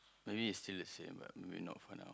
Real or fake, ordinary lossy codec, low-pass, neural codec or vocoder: real; none; none; none